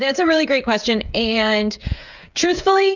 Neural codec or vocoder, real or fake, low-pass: codec, 16 kHz, 8 kbps, FreqCodec, smaller model; fake; 7.2 kHz